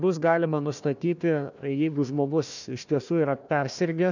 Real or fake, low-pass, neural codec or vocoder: fake; 7.2 kHz; codec, 16 kHz, 1 kbps, FunCodec, trained on Chinese and English, 50 frames a second